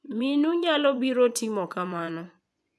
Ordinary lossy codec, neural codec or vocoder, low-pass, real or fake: none; vocoder, 24 kHz, 100 mel bands, Vocos; none; fake